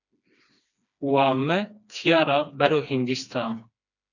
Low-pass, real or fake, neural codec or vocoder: 7.2 kHz; fake; codec, 16 kHz, 2 kbps, FreqCodec, smaller model